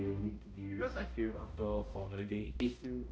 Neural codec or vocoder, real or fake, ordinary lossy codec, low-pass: codec, 16 kHz, 0.5 kbps, X-Codec, HuBERT features, trained on balanced general audio; fake; none; none